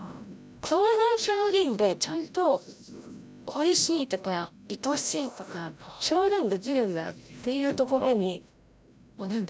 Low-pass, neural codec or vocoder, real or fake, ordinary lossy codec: none; codec, 16 kHz, 0.5 kbps, FreqCodec, larger model; fake; none